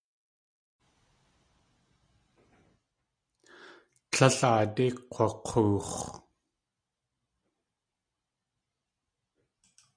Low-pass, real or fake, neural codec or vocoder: 9.9 kHz; real; none